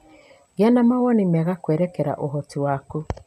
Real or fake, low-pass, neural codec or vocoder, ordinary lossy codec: real; 14.4 kHz; none; none